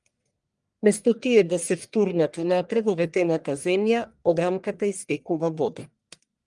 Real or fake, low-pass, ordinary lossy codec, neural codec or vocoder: fake; 10.8 kHz; Opus, 32 kbps; codec, 44.1 kHz, 1.7 kbps, Pupu-Codec